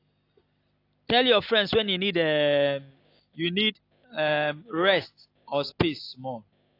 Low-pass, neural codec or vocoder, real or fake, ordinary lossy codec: 5.4 kHz; none; real; AAC, 32 kbps